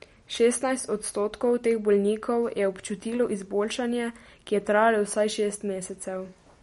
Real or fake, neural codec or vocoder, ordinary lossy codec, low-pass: real; none; MP3, 48 kbps; 19.8 kHz